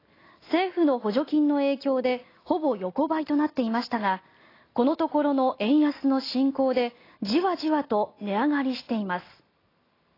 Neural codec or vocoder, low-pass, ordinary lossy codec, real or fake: none; 5.4 kHz; AAC, 24 kbps; real